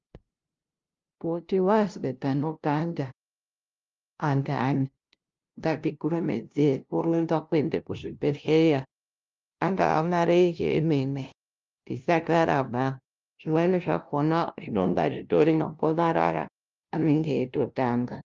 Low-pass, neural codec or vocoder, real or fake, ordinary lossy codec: 7.2 kHz; codec, 16 kHz, 0.5 kbps, FunCodec, trained on LibriTTS, 25 frames a second; fake; Opus, 32 kbps